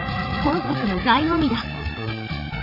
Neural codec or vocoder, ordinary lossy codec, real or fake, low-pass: codec, 16 kHz, 8 kbps, FreqCodec, larger model; none; fake; 5.4 kHz